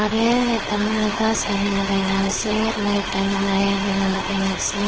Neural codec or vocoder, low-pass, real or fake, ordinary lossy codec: codec, 16 kHz, 4.8 kbps, FACodec; 7.2 kHz; fake; Opus, 16 kbps